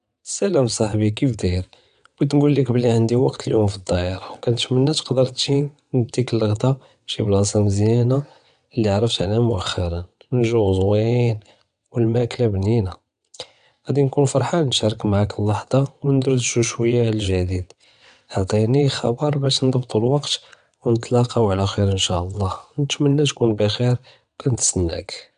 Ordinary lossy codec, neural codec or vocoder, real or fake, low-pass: none; vocoder, 22.05 kHz, 80 mel bands, WaveNeXt; fake; 9.9 kHz